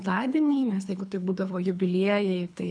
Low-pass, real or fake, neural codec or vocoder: 9.9 kHz; fake; codec, 24 kHz, 3 kbps, HILCodec